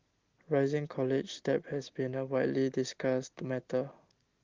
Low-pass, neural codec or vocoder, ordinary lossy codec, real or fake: 7.2 kHz; none; Opus, 16 kbps; real